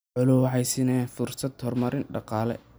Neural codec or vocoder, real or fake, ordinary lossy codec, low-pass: none; real; none; none